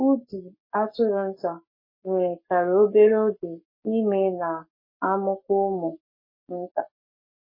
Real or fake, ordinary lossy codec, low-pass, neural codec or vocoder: fake; MP3, 24 kbps; 5.4 kHz; codec, 44.1 kHz, 7.8 kbps, Pupu-Codec